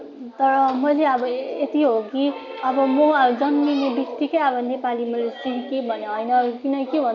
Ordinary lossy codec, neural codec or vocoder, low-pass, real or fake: none; none; 7.2 kHz; real